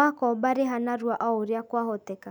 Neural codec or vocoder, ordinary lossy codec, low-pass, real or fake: none; none; 19.8 kHz; real